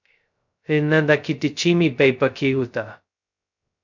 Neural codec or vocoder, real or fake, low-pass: codec, 16 kHz, 0.2 kbps, FocalCodec; fake; 7.2 kHz